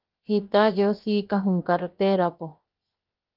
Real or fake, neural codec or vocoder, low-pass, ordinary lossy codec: fake; codec, 16 kHz, 0.7 kbps, FocalCodec; 5.4 kHz; Opus, 32 kbps